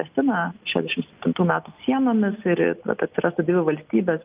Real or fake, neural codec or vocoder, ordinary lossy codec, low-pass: real; none; Opus, 24 kbps; 3.6 kHz